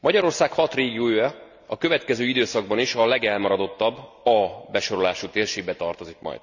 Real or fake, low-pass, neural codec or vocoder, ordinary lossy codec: real; 7.2 kHz; none; none